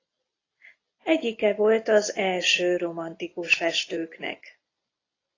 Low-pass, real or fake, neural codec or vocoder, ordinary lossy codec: 7.2 kHz; real; none; AAC, 32 kbps